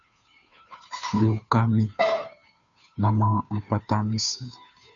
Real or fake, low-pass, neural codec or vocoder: fake; 7.2 kHz; codec, 16 kHz, 2 kbps, FunCodec, trained on Chinese and English, 25 frames a second